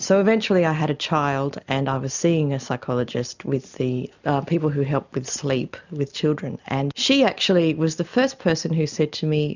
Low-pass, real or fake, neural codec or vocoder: 7.2 kHz; real; none